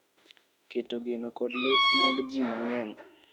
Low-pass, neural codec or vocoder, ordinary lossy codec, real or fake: 19.8 kHz; autoencoder, 48 kHz, 32 numbers a frame, DAC-VAE, trained on Japanese speech; none; fake